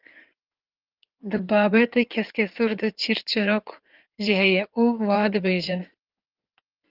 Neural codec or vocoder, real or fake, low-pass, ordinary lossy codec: codec, 16 kHz in and 24 kHz out, 2.2 kbps, FireRedTTS-2 codec; fake; 5.4 kHz; Opus, 32 kbps